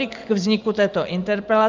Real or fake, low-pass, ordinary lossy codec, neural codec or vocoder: real; 7.2 kHz; Opus, 24 kbps; none